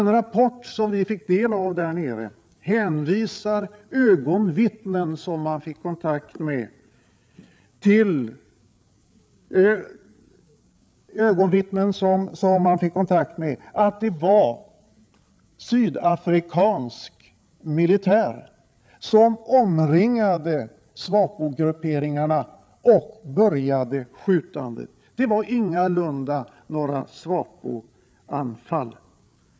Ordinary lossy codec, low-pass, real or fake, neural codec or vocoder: none; none; fake; codec, 16 kHz, 8 kbps, FreqCodec, larger model